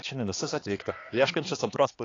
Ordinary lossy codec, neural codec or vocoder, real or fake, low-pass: AAC, 32 kbps; codec, 16 kHz, 2 kbps, X-Codec, HuBERT features, trained on balanced general audio; fake; 7.2 kHz